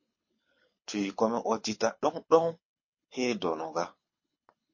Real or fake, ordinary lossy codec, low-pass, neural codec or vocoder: fake; MP3, 32 kbps; 7.2 kHz; codec, 24 kHz, 6 kbps, HILCodec